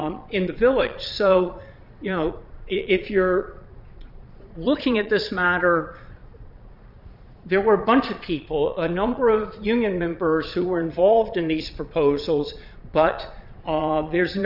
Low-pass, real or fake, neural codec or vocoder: 5.4 kHz; fake; vocoder, 22.05 kHz, 80 mel bands, Vocos